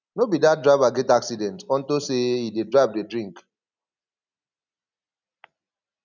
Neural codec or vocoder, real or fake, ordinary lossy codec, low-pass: none; real; none; 7.2 kHz